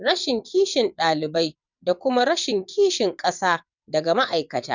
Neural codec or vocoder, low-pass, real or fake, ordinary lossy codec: vocoder, 22.05 kHz, 80 mel bands, WaveNeXt; 7.2 kHz; fake; none